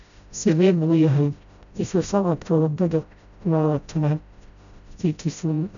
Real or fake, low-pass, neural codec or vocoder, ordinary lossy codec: fake; 7.2 kHz; codec, 16 kHz, 0.5 kbps, FreqCodec, smaller model; none